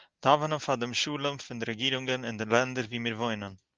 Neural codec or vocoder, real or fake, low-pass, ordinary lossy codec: none; real; 7.2 kHz; Opus, 32 kbps